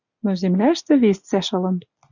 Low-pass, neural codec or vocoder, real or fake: 7.2 kHz; none; real